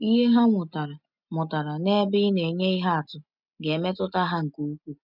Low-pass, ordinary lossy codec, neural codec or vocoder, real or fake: 5.4 kHz; none; none; real